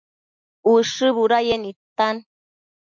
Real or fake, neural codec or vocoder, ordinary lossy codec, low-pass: real; none; MP3, 48 kbps; 7.2 kHz